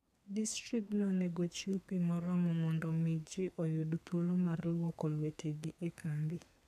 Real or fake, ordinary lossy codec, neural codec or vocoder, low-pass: fake; none; codec, 32 kHz, 1.9 kbps, SNAC; 14.4 kHz